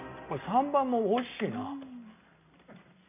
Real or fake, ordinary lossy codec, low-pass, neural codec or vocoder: real; none; 3.6 kHz; none